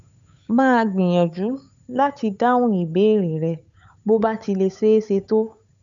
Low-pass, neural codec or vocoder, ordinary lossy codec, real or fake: 7.2 kHz; codec, 16 kHz, 8 kbps, FunCodec, trained on Chinese and English, 25 frames a second; none; fake